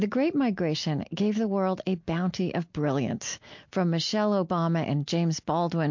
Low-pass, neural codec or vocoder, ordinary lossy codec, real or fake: 7.2 kHz; none; MP3, 48 kbps; real